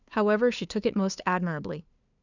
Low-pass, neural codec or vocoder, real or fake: 7.2 kHz; codec, 16 kHz, 2 kbps, FunCodec, trained on LibriTTS, 25 frames a second; fake